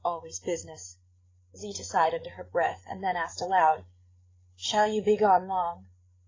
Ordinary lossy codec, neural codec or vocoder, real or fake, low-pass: AAC, 32 kbps; codec, 16 kHz, 8 kbps, FreqCodec, larger model; fake; 7.2 kHz